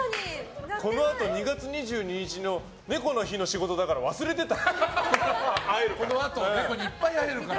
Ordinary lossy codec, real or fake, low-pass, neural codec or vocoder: none; real; none; none